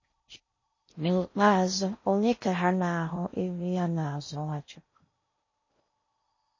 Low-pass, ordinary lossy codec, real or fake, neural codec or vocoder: 7.2 kHz; MP3, 32 kbps; fake; codec, 16 kHz in and 24 kHz out, 0.6 kbps, FocalCodec, streaming, 4096 codes